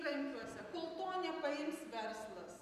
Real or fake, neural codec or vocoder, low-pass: real; none; 14.4 kHz